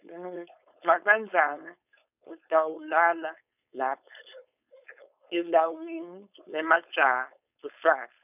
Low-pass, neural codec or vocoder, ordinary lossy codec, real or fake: 3.6 kHz; codec, 16 kHz, 4.8 kbps, FACodec; none; fake